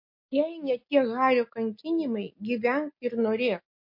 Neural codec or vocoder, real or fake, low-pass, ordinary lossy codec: none; real; 5.4 kHz; MP3, 32 kbps